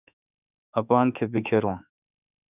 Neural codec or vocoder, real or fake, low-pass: autoencoder, 48 kHz, 32 numbers a frame, DAC-VAE, trained on Japanese speech; fake; 3.6 kHz